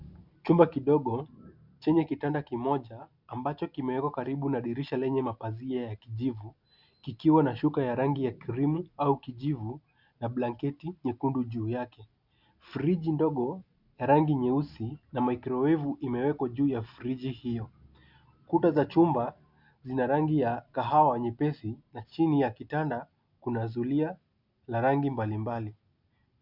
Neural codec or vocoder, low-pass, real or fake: none; 5.4 kHz; real